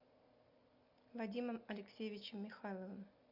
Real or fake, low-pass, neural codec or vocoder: real; 5.4 kHz; none